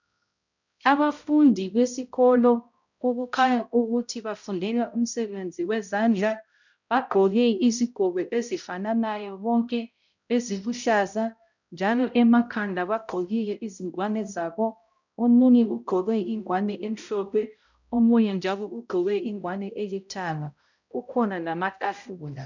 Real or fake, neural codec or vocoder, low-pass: fake; codec, 16 kHz, 0.5 kbps, X-Codec, HuBERT features, trained on balanced general audio; 7.2 kHz